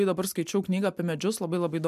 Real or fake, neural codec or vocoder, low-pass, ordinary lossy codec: real; none; 14.4 kHz; MP3, 96 kbps